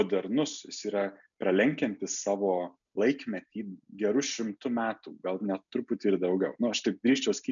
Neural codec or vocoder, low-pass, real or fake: none; 7.2 kHz; real